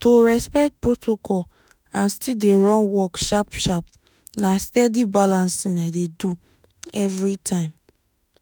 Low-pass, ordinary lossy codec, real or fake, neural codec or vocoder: none; none; fake; autoencoder, 48 kHz, 32 numbers a frame, DAC-VAE, trained on Japanese speech